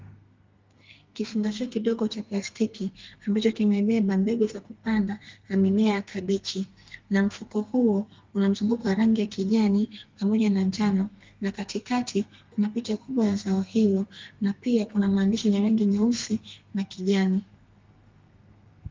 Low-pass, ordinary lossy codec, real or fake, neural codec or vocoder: 7.2 kHz; Opus, 32 kbps; fake; codec, 32 kHz, 1.9 kbps, SNAC